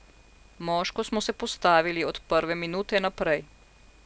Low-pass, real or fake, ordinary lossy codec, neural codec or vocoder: none; real; none; none